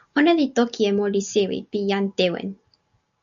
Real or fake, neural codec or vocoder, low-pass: real; none; 7.2 kHz